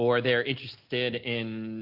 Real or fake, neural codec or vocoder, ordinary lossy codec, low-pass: real; none; MP3, 48 kbps; 5.4 kHz